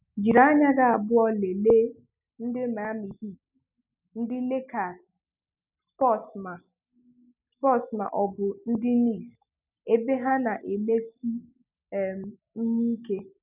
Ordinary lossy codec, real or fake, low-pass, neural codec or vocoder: none; real; 3.6 kHz; none